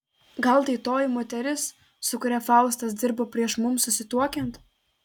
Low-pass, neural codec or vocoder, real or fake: 19.8 kHz; none; real